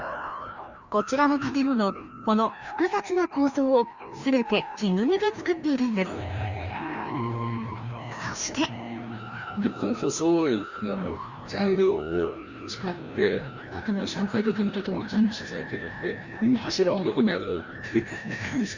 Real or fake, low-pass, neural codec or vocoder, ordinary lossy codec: fake; 7.2 kHz; codec, 16 kHz, 1 kbps, FreqCodec, larger model; Opus, 64 kbps